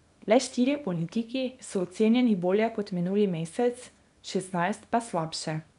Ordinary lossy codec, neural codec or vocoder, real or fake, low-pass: none; codec, 24 kHz, 0.9 kbps, WavTokenizer, small release; fake; 10.8 kHz